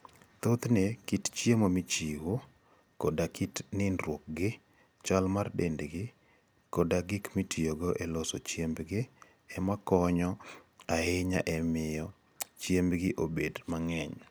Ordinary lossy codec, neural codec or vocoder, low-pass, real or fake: none; none; none; real